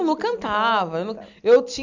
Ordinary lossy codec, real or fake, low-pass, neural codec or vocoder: none; real; 7.2 kHz; none